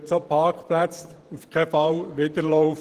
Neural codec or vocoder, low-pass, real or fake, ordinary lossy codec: vocoder, 48 kHz, 128 mel bands, Vocos; 14.4 kHz; fake; Opus, 16 kbps